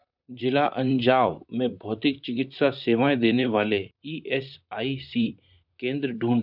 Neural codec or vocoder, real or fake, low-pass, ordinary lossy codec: vocoder, 44.1 kHz, 128 mel bands, Pupu-Vocoder; fake; 5.4 kHz; none